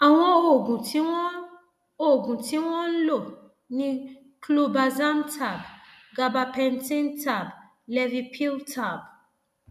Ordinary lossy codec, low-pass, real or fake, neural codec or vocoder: none; 14.4 kHz; real; none